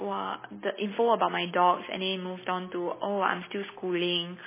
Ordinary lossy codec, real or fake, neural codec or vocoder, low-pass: MP3, 16 kbps; real; none; 3.6 kHz